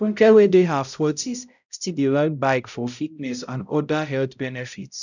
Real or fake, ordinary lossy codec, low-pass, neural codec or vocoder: fake; none; 7.2 kHz; codec, 16 kHz, 0.5 kbps, X-Codec, HuBERT features, trained on balanced general audio